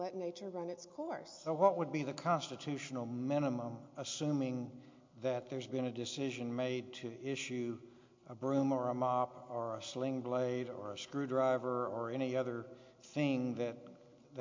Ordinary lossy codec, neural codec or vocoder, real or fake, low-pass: MP3, 48 kbps; none; real; 7.2 kHz